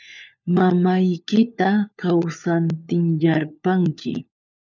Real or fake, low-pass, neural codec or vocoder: fake; 7.2 kHz; codec, 16 kHz, 4 kbps, FunCodec, trained on LibriTTS, 50 frames a second